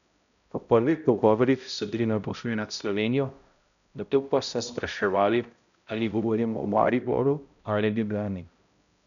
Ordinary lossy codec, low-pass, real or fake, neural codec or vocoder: none; 7.2 kHz; fake; codec, 16 kHz, 0.5 kbps, X-Codec, HuBERT features, trained on balanced general audio